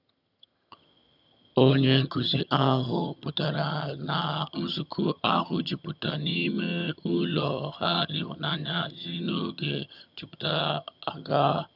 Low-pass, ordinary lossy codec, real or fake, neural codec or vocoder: 5.4 kHz; none; fake; vocoder, 22.05 kHz, 80 mel bands, HiFi-GAN